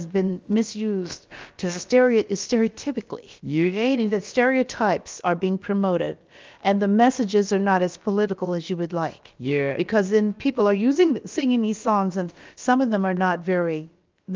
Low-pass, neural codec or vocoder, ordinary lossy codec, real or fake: 7.2 kHz; codec, 16 kHz, about 1 kbps, DyCAST, with the encoder's durations; Opus, 32 kbps; fake